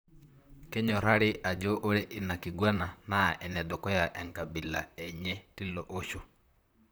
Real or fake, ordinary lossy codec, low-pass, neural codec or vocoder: fake; none; none; vocoder, 44.1 kHz, 128 mel bands, Pupu-Vocoder